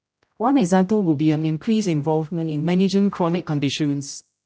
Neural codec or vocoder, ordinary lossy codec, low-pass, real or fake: codec, 16 kHz, 0.5 kbps, X-Codec, HuBERT features, trained on general audio; none; none; fake